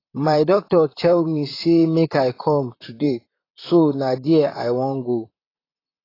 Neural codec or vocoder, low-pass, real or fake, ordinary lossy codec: vocoder, 44.1 kHz, 128 mel bands, Pupu-Vocoder; 5.4 kHz; fake; AAC, 24 kbps